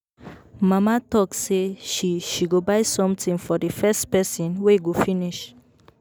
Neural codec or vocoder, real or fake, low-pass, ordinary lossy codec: none; real; none; none